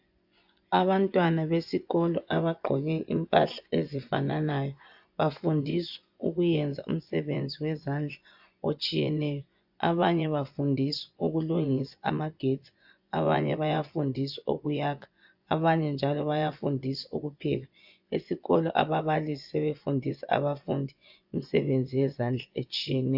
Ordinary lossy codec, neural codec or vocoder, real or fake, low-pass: AAC, 48 kbps; vocoder, 24 kHz, 100 mel bands, Vocos; fake; 5.4 kHz